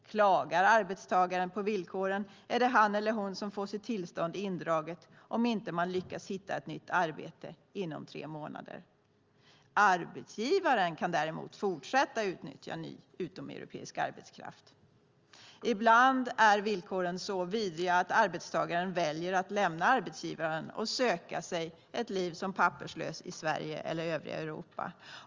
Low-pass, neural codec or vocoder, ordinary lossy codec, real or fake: 7.2 kHz; none; Opus, 32 kbps; real